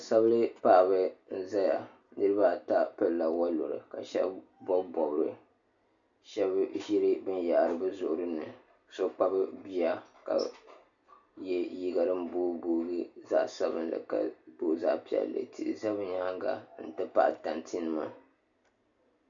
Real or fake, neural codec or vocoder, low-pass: real; none; 7.2 kHz